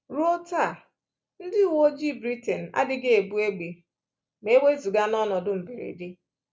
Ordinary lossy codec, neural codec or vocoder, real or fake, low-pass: none; none; real; none